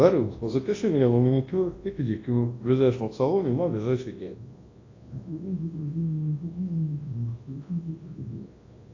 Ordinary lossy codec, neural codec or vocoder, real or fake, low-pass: AAC, 32 kbps; codec, 24 kHz, 0.9 kbps, WavTokenizer, large speech release; fake; 7.2 kHz